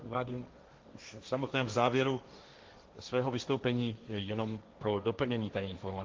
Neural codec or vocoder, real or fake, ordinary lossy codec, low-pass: codec, 16 kHz, 1.1 kbps, Voila-Tokenizer; fake; Opus, 32 kbps; 7.2 kHz